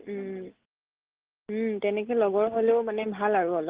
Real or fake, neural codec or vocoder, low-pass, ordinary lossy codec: real; none; 3.6 kHz; Opus, 16 kbps